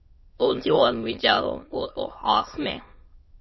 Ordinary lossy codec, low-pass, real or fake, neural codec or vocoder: MP3, 24 kbps; 7.2 kHz; fake; autoencoder, 22.05 kHz, a latent of 192 numbers a frame, VITS, trained on many speakers